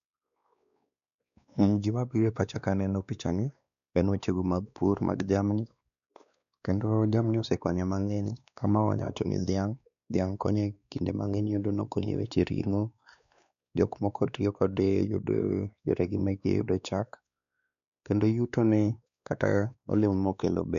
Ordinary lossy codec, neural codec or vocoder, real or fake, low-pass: none; codec, 16 kHz, 2 kbps, X-Codec, WavLM features, trained on Multilingual LibriSpeech; fake; 7.2 kHz